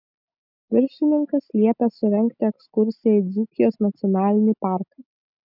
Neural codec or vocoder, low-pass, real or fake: none; 5.4 kHz; real